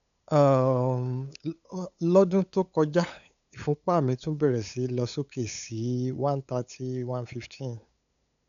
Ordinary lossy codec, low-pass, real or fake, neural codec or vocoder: none; 7.2 kHz; fake; codec, 16 kHz, 8 kbps, FunCodec, trained on LibriTTS, 25 frames a second